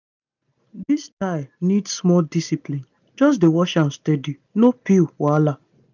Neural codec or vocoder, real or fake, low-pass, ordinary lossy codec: none; real; 7.2 kHz; none